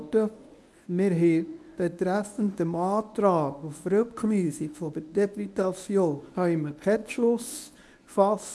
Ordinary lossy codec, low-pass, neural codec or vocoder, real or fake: none; none; codec, 24 kHz, 0.9 kbps, WavTokenizer, medium speech release version 1; fake